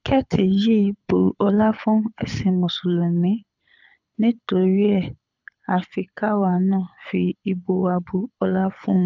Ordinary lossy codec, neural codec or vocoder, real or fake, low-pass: none; codec, 24 kHz, 6 kbps, HILCodec; fake; 7.2 kHz